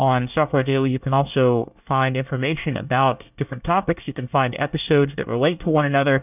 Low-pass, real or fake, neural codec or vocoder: 3.6 kHz; fake; codec, 24 kHz, 1 kbps, SNAC